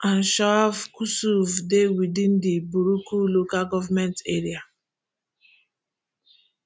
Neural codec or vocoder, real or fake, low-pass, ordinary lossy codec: none; real; none; none